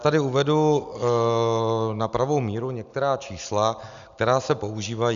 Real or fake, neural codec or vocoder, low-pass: real; none; 7.2 kHz